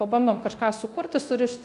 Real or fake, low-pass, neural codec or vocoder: fake; 10.8 kHz; codec, 24 kHz, 0.9 kbps, DualCodec